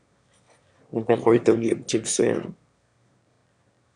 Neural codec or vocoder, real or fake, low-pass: autoencoder, 22.05 kHz, a latent of 192 numbers a frame, VITS, trained on one speaker; fake; 9.9 kHz